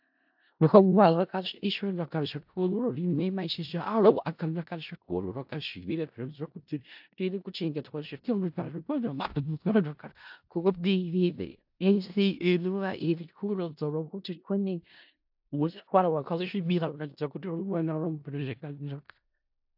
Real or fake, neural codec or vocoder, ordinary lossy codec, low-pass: fake; codec, 16 kHz in and 24 kHz out, 0.4 kbps, LongCat-Audio-Codec, four codebook decoder; AAC, 48 kbps; 5.4 kHz